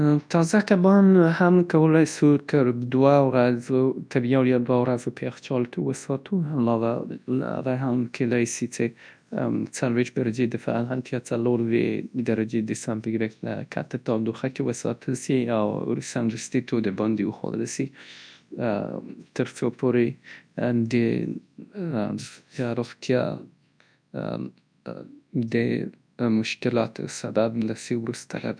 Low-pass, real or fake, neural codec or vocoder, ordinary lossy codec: 9.9 kHz; fake; codec, 24 kHz, 0.9 kbps, WavTokenizer, large speech release; none